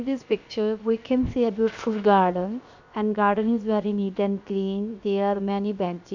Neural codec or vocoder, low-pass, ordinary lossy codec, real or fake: codec, 16 kHz, 0.7 kbps, FocalCodec; 7.2 kHz; none; fake